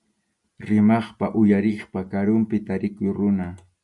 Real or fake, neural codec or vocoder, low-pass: real; none; 10.8 kHz